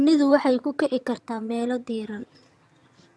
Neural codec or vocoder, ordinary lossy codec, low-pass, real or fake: vocoder, 22.05 kHz, 80 mel bands, HiFi-GAN; none; none; fake